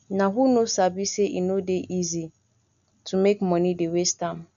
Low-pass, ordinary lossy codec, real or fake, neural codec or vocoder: 7.2 kHz; none; real; none